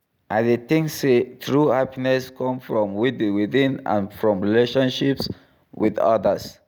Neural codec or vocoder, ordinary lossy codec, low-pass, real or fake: none; none; none; real